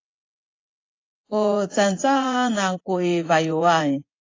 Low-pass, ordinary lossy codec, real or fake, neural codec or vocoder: 7.2 kHz; AAC, 32 kbps; fake; vocoder, 24 kHz, 100 mel bands, Vocos